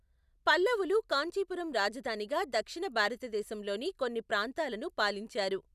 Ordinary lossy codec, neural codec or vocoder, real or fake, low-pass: none; none; real; 14.4 kHz